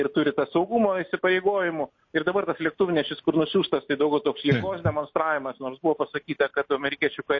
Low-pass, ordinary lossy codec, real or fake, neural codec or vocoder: 7.2 kHz; MP3, 32 kbps; real; none